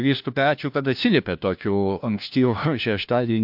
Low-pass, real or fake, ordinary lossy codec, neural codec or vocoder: 5.4 kHz; fake; MP3, 48 kbps; codec, 16 kHz, 1 kbps, FunCodec, trained on LibriTTS, 50 frames a second